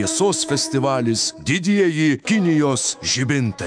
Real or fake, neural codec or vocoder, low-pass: fake; autoencoder, 48 kHz, 128 numbers a frame, DAC-VAE, trained on Japanese speech; 9.9 kHz